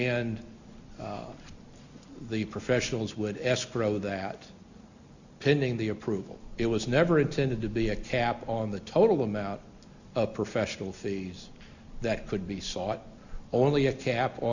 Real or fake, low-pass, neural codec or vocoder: real; 7.2 kHz; none